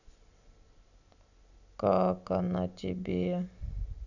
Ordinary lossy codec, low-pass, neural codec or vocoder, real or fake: none; 7.2 kHz; none; real